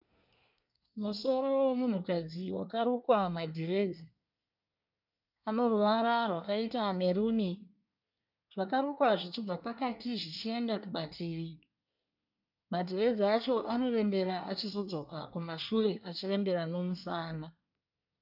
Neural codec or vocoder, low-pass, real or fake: codec, 24 kHz, 1 kbps, SNAC; 5.4 kHz; fake